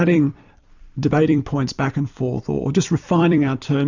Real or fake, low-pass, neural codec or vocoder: fake; 7.2 kHz; vocoder, 44.1 kHz, 128 mel bands every 256 samples, BigVGAN v2